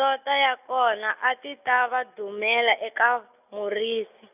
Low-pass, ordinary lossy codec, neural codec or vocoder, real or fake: 3.6 kHz; none; none; real